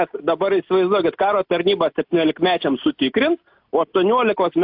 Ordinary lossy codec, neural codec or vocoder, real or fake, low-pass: AAC, 48 kbps; none; real; 5.4 kHz